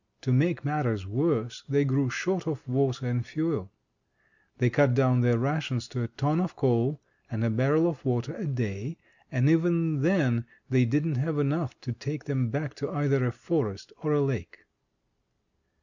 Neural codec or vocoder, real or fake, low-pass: none; real; 7.2 kHz